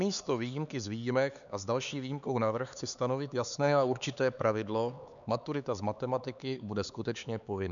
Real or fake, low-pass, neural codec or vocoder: fake; 7.2 kHz; codec, 16 kHz, 4 kbps, X-Codec, HuBERT features, trained on LibriSpeech